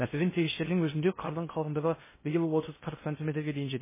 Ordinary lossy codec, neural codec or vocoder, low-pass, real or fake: MP3, 16 kbps; codec, 16 kHz in and 24 kHz out, 0.6 kbps, FocalCodec, streaming, 2048 codes; 3.6 kHz; fake